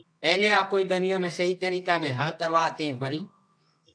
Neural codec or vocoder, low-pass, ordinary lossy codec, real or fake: codec, 24 kHz, 0.9 kbps, WavTokenizer, medium music audio release; 9.9 kHz; AAC, 64 kbps; fake